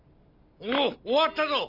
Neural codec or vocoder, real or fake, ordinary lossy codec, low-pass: none; real; none; 5.4 kHz